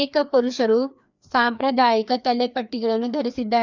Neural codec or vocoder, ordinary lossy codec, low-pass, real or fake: codec, 16 kHz, 2 kbps, FreqCodec, larger model; none; 7.2 kHz; fake